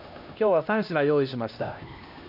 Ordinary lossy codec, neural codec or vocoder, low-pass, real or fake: none; codec, 16 kHz, 1 kbps, X-Codec, HuBERT features, trained on LibriSpeech; 5.4 kHz; fake